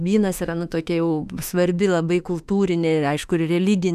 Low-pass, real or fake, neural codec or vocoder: 14.4 kHz; fake; autoencoder, 48 kHz, 32 numbers a frame, DAC-VAE, trained on Japanese speech